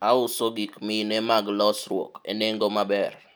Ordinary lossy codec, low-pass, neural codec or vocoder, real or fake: none; none; none; real